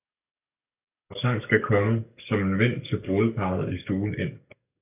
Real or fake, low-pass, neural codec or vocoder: fake; 3.6 kHz; codec, 44.1 kHz, 7.8 kbps, DAC